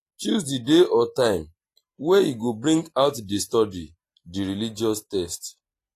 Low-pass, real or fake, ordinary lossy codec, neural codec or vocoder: 14.4 kHz; real; AAC, 48 kbps; none